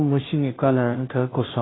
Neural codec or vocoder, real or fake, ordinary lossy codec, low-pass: codec, 16 kHz, 0.5 kbps, FunCodec, trained on Chinese and English, 25 frames a second; fake; AAC, 16 kbps; 7.2 kHz